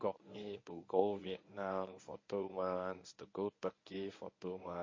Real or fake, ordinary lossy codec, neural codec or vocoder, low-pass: fake; MP3, 32 kbps; codec, 24 kHz, 0.9 kbps, WavTokenizer, medium speech release version 1; 7.2 kHz